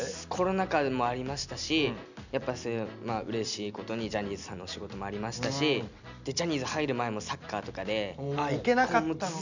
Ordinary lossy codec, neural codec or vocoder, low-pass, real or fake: none; none; 7.2 kHz; real